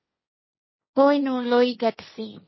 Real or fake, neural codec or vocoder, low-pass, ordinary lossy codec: fake; codec, 16 kHz, 1.1 kbps, Voila-Tokenizer; 7.2 kHz; MP3, 24 kbps